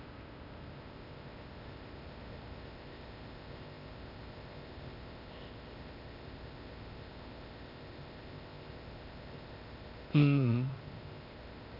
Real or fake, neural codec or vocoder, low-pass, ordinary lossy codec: fake; codec, 16 kHz, 0.8 kbps, ZipCodec; 5.4 kHz; none